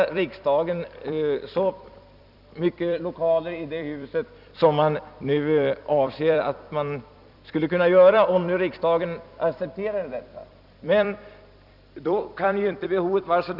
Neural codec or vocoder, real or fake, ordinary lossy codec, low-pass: vocoder, 44.1 kHz, 128 mel bands every 256 samples, BigVGAN v2; fake; none; 5.4 kHz